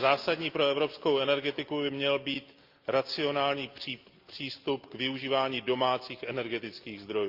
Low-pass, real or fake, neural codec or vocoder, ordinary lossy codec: 5.4 kHz; real; none; Opus, 24 kbps